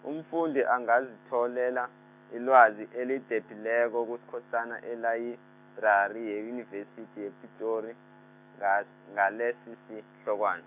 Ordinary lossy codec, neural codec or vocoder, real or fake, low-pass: none; autoencoder, 48 kHz, 128 numbers a frame, DAC-VAE, trained on Japanese speech; fake; 3.6 kHz